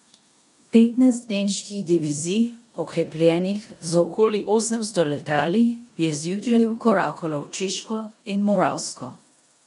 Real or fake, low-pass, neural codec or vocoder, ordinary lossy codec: fake; 10.8 kHz; codec, 16 kHz in and 24 kHz out, 0.9 kbps, LongCat-Audio-Codec, four codebook decoder; none